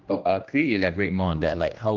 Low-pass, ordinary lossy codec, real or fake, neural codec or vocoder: 7.2 kHz; Opus, 24 kbps; fake; codec, 16 kHz, 1 kbps, X-Codec, HuBERT features, trained on general audio